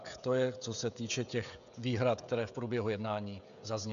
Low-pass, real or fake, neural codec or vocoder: 7.2 kHz; fake; codec, 16 kHz, 16 kbps, FreqCodec, smaller model